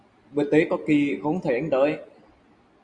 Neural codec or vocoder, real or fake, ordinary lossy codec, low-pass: none; real; Opus, 64 kbps; 9.9 kHz